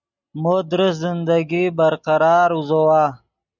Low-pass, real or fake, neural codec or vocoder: 7.2 kHz; real; none